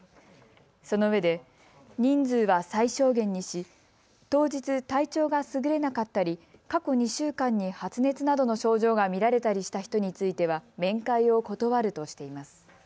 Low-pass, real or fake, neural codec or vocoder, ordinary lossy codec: none; real; none; none